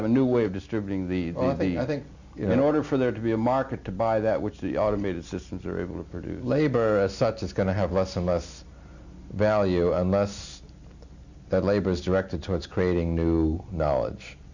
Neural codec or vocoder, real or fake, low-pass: none; real; 7.2 kHz